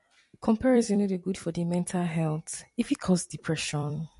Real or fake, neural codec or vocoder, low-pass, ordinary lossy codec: fake; vocoder, 44.1 kHz, 128 mel bands every 256 samples, BigVGAN v2; 14.4 kHz; MP3, 48 kbps